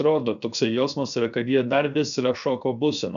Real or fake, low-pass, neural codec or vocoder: fake; 7.2 kHz; codec, 16 kHz, 0.7 kbps, FocalCodec